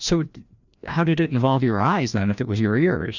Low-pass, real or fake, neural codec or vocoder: 7.2 kHz; fake; codec, 16 kHz, 1 kbps, FreqCodec, larger model